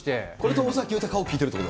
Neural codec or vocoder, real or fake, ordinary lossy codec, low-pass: none; real; none; none